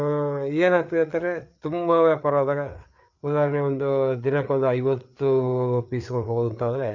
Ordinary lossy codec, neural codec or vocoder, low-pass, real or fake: none; codec, 16 kHz, 4 kbps, FreqCodec, larger model; 7.2 kHz; fake